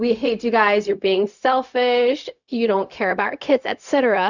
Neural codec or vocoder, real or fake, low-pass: codec, 16 kHz, 0.4 kbps, LongCat-Audio-Codec; fake; 7.2 kHz